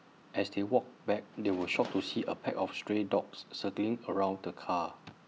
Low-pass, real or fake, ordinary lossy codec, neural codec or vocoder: none; real; none; none